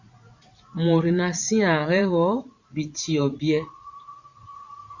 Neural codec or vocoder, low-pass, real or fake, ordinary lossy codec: vocoder, 44.1 kHz, 80 mel bands, Vocos; 7.2 kHz; fake; Opus, 64 kbps